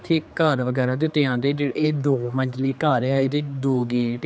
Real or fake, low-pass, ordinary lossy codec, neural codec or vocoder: fake; none; none; codec, 16 kHz, 4 kbps, X-Codec, HuBERT features, trained on general audio